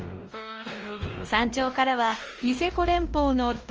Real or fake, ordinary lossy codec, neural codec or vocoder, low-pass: fake; Opus, 24 kbps; codec, 16 kHz, 1 kbps, X-Codec, WavLM features, trained on Multilingual LibriSpeech; 7.2 kHz